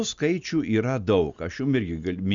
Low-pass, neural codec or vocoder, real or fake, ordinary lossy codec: 7.2 kHz; none; real; Opus, 64 kbps